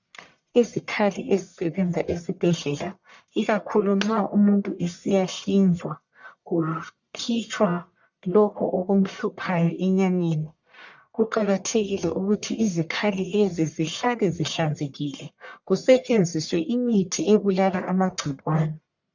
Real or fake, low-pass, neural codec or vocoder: fake; 7.2 kHz; codec, 44.1 kHz, 1.7 kbps, Pupu-Codec